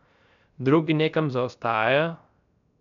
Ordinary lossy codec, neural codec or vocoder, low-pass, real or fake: none; codec, 16 kHz, 0.3 kbps, FocalCodec; 7.2 kHz; fake